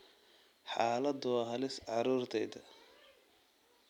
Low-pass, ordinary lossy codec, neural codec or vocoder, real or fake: 19.8 kHz; none; none; real